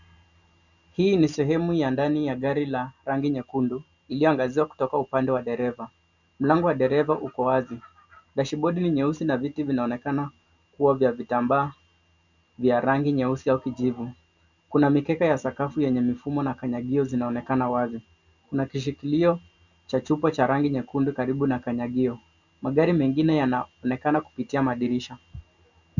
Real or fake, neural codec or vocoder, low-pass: real; none; 7.2 kHz